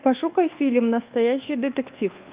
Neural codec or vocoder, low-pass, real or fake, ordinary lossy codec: codec, 24 kHz, 1.2 kbps, DualCodec; 3.6 kHz; fake; Opus, 32 kbps